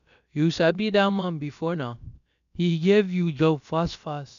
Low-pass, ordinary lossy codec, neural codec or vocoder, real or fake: 7.2 kHz; none; codec, 16 kHz, about 1 kbps, DyCAST, with the encoder's durations; fake